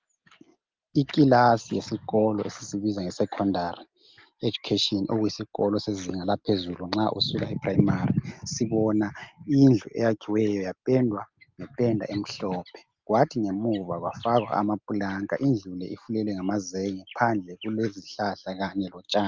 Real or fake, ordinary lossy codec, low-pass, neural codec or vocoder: real; Opus, 24 kbps; 7.2 kHz; none